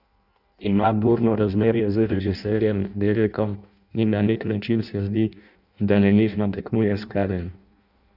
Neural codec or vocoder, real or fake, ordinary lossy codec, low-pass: codec, 16 kHz in and 24 kHz out, 0.6 kbps, FireRedTTS-2 codec; fake; none; 5.4 kHz